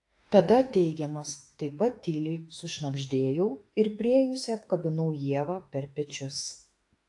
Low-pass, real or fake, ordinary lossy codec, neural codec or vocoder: 10.8 kHz; fake; AAC, 48 kbps; autoencoder, 48 kHz, 32 numbers a frame, DAC-VAE, trained on Japanese speech